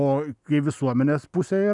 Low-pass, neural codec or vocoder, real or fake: 10.8 kHz; none; real